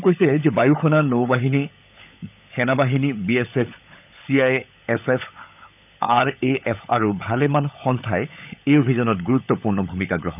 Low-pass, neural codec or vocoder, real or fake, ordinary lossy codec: 3.6 kHz; codec, 16 kHz, 16 kbps, FunCodec, trained on LibriTTS, 50 frames a second; fake; none